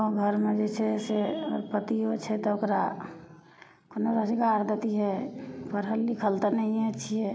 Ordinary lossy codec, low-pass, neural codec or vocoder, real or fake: none; none; none; real